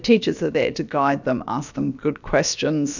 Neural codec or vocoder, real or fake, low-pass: codec, 16 kHz, about 1 kbps, DyCAST, with the encoder's durations; fake; 7.2 kHz